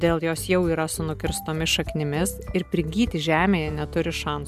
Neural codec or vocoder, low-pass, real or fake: none; 14.4 kHz; real